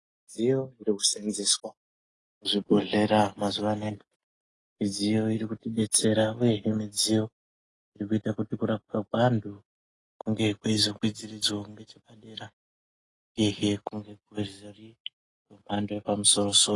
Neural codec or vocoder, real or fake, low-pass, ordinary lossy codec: none; real; 10.8 kHz; AAC, 32 kbps